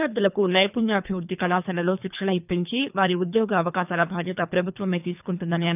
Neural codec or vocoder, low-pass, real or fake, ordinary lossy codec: codec, 24 kHz, 3 kbps, HILCodec; 3.6 kHz; fake; none